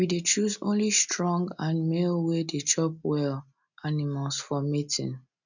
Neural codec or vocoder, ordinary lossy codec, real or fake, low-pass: none; none; real; 7.2 kHz